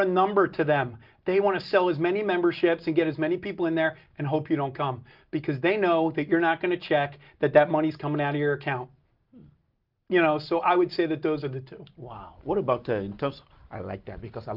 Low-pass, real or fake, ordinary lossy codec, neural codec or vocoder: 5.4 kHz; real; Opus, 32 kbps; none